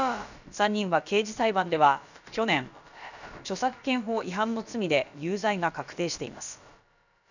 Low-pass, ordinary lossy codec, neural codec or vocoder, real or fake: 7.2 kHz; none; codec, 16 kHz, about 1 kbps, DyCAST, with the encoder's durations; fake